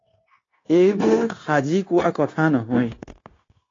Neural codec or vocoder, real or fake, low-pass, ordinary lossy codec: codec, 16 kHz, 0.9 kbps, LongCat-Audio-Codec; fake; 7.2 kHz; AAC, 32 kbps